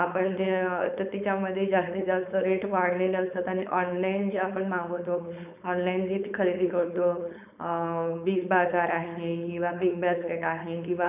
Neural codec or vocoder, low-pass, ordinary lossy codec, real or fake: codec, 16 kHz, 4.8 kbps, FACodec; 3.6 kHz; none; fake